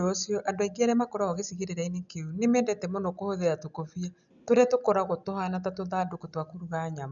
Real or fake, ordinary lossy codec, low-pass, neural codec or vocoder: real; none; 7.2 kHz; none